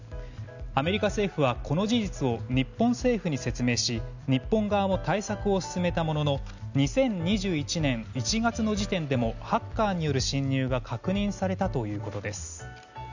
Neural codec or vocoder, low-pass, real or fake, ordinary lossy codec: none; 7.2 kHz; real; none